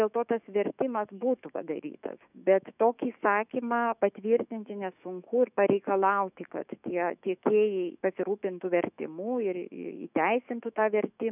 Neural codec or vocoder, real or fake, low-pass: autoencoder, 48 kHz, 128 numbers a frame, DAC-VAE, trained on Japanese speech; fake; 3.6 kHz